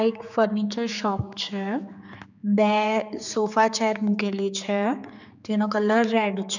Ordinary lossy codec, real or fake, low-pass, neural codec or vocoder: none; fake; 7.2 kHz; codec, 16 kHz, 4 kbps, X-Codec, HuBERT features, trained on general audio